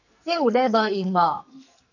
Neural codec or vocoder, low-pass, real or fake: codec, 44.1 kHz, 2.6 kbps, SNAC; 7.2 kHz; fake